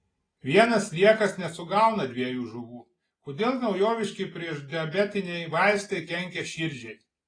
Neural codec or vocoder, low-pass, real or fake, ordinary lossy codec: none; 9.9 kHz; real; AAC, 32 kbps